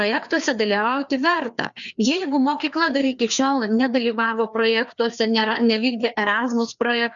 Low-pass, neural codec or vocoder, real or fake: 7.2 kHz; codec, 16 kHz, 2 kbps, FreqCodec, larger model; fake